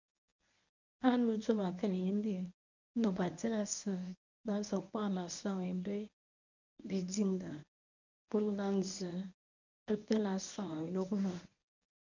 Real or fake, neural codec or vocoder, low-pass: fake; codec, 24 kHz, 0.9 kbps, WavTokenizer, medium speech release version 1; 7.2 kHz